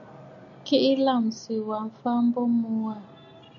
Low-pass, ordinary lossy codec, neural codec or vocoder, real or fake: 7.2 kHz; AAC, 64 kbps; none; real